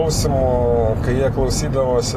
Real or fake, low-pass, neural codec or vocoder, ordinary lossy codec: real; 14.4 kHz; none; AAC, 48 kbps